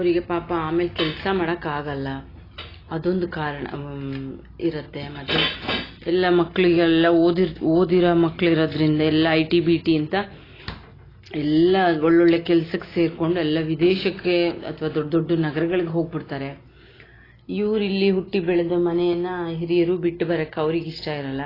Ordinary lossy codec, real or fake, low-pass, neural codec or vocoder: AAC, 24 kbps; real; 5.4 kHz; none